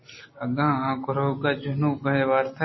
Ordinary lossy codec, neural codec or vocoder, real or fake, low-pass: MP3, 24 kbps; none; real; 7.2 kHz